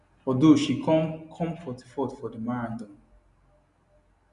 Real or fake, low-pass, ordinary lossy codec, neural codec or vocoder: real; 10.8 kHz; none; none